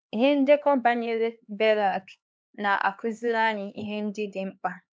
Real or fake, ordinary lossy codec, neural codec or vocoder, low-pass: fake; none; codec, 16 kHz, 2 kbps, X-Codec, HuBERT features, trained on LibriSpeech; none